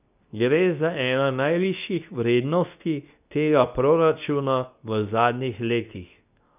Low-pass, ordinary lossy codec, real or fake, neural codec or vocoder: 3.6 kHz; none; fake; codec, 24 kHz, 0.9 kbps, WavTokenizer, medium speech release version 2